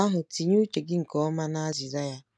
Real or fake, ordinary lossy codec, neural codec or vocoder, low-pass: real; none; none; none